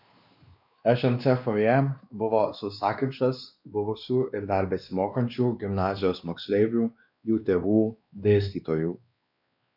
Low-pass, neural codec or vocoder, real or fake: 5.4 kHz; codec, 16 kHz, 2 kbps, X-Codec, WavLM features, trained on Multilingual LibriSpeech; fake